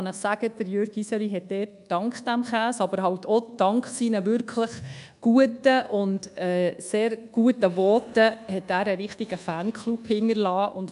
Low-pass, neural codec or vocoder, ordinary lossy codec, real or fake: 10.8 kHz; codec, 24 kHz, 1.2 kbps, DualCodec; none; fake